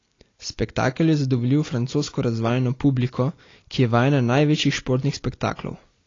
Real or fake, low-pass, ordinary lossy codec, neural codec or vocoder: real; 7.2 kHz; AAC, 32 kbps; none